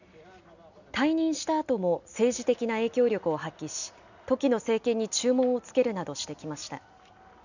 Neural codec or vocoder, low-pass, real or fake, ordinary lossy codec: none; 7.2 kHz; real; none